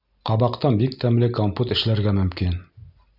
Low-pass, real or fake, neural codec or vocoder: 5.4 kHz; real; none